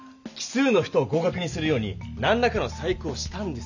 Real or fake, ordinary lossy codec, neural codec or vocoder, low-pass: real; none; none; 7.2 kHz